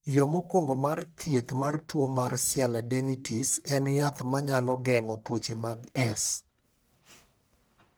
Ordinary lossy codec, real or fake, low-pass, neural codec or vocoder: none; fake; none; codec, 44.1 kHz, 1.7 kbps, Pupu-Codec